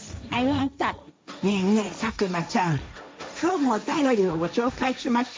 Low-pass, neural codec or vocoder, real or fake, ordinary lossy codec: none; codec, 16 kHz, 1.1 kbps, Voila-Tokenizer; fake; none